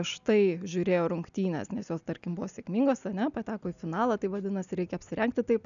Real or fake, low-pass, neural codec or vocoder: real; 7.2 kHz; none